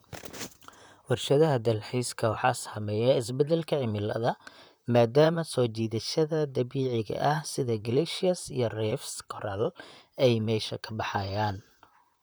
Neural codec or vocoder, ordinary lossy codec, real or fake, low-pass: vocoder, 44.1 kHz, 128 mel bands, Pupu-Vocoder; none; fake; none